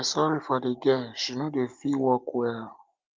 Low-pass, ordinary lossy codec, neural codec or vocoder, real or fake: 7.2 kHz; Opus, 32 kbps; none; real